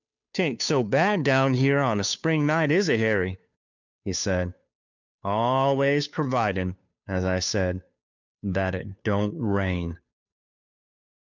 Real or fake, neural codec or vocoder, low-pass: fake; codec, 16 kHz, 2 kbps, FunCodec, trained on Chinese and English, 25 frames a second; 7.2 kHz